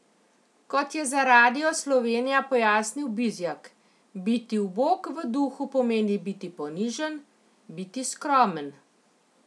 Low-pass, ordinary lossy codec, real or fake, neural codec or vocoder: none; none; real; none